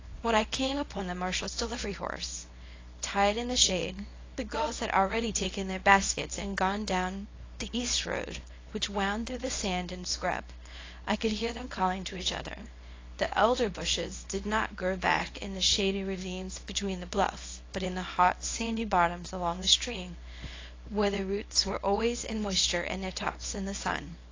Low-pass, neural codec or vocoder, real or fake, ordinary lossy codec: 7.2 kHz; codec, 24 kHz, 0.9 kbps, WavTokenizer, small release; fake; AAC, 32 kbps